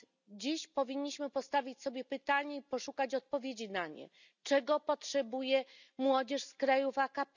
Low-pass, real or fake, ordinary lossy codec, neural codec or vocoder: 7.2 kHz; real; none; none